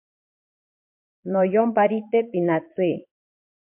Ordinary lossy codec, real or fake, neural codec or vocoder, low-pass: MP3, 32 kbps; fake; vocoder, 22.05 kHz, 80 mel bands, Vocos; 3.6 kHz